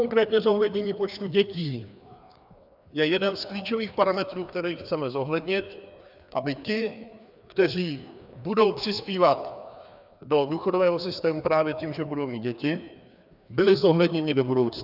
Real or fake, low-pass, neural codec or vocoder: fake; 5.4 kHz; codec, 16 kHz, 2 kbps, FreqCodec, larger model